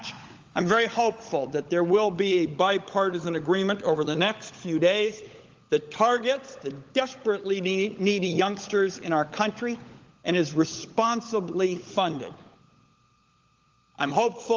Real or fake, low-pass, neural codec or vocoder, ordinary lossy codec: fake; 7.2 kHz; codec, 16 kHz, 16 kbps, FunCodec, trained on LibriTTS, 50 frames a second; Opus, 32 kbps